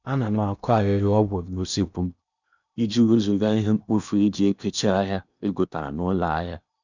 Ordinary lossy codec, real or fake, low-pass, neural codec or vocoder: none; fake; 7.2 kHz; codec, 16 kHz in and 24 kHz out, 0.8 kbps, FocalCodec, streaming, 65536 codes